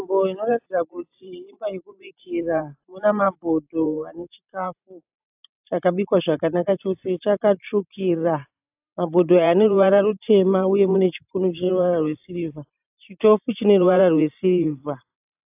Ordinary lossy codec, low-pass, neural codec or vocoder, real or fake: AAC, 32 kbps; 3.6 kHz; vocoder, 44.1 kHz, 128 mel bands every 512 samples, BigVGAN v2; fake